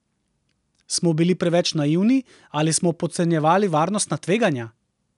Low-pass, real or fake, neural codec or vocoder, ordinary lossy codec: 10.8 kHz; real; none; none